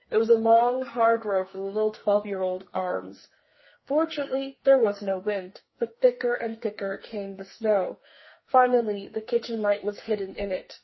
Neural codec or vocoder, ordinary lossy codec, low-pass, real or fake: codec, 44.1 kHz, 2.6 kbps, SNAC; MP3, 24 kbps; 7.2 kHz; fake